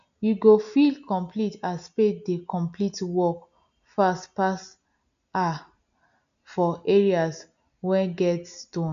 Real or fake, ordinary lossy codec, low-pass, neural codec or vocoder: real; none; 7.2 kHz; none